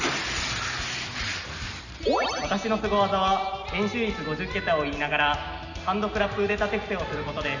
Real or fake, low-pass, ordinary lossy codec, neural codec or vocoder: real; 7.2 kHz; none; none